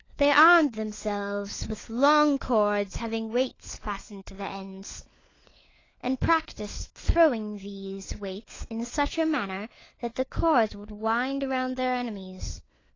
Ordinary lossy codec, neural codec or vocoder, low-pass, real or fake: AAC, 32 kbps; codec, 16 kHz, 4 kbps, FunCodec, trained on LibriTTS, 50 frames a second; 7.2 kHz; fake